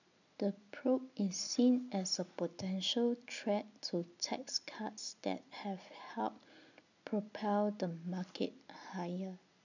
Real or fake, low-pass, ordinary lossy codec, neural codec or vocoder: real; 7.2 kHz; none; none